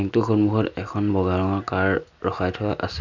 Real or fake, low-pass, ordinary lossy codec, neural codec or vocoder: real; 7.2 kHz; none; none